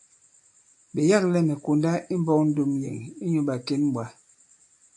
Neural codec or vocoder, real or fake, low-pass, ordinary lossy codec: none; real; 10.8 kHz; AAC, 64 kbps